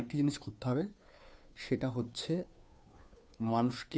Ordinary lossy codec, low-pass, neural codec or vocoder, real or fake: none; none; codec, 16 kHz, 2 kbps, FunCodec, trained on Chinese and English, 25 frames a second; fake